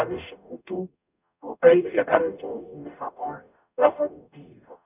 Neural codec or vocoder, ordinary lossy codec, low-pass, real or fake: codec, 44.1 kHz, 0.9 kbps, DAC; none; 3.6 kHz; fake